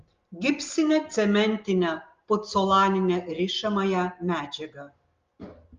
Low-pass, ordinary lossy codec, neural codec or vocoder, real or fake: 7.2 kHz; Opus, 32 kbps; none; real